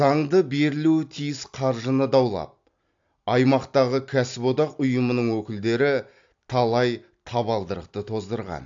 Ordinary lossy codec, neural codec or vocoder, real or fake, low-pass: AAC, 64 kbps; none; real; 7.2 kHz